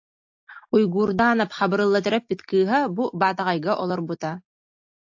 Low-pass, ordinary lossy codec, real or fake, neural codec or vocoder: 7.2 kHz; AAC, 48 kbps; real; none